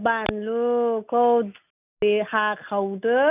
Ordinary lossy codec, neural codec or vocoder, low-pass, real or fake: none; none; 3.6 kHz; real